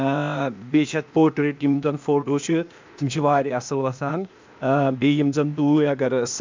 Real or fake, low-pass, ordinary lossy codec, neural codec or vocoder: fake; 7.2 kHz; MP3, 64 kbps; codec, 16 kHz, 0.8 kbps, ZipCodec